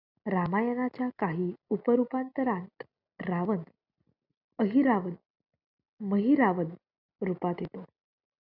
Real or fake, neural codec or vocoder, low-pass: real; none; 5.4 kHz